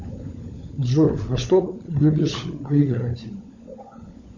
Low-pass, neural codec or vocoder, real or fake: 7.2 kHz; codec, 16 kHz, 16 kbps, FunCodec, trained on LibriTTS, 50 frames a second; fake